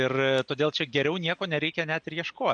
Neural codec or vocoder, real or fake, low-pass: none; real; 9.9 kHz